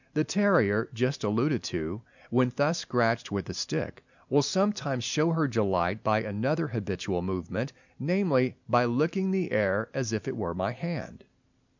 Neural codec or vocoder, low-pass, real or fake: none; 7.2 kHz; real